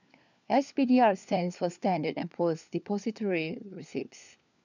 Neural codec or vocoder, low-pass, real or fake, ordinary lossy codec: codec, 16 kHz, 4 kbps, FunCodec, trained on LibriTTS, 50 frames a second; 7.2 kHz; fake; none